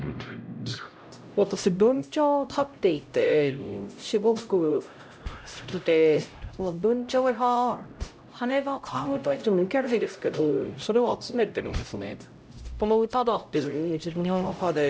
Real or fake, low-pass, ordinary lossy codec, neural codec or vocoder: fake; none; none; codec, 16 kHz, 0.5 kbps, X-Codec, HuBERT features, trained on LibriSpeech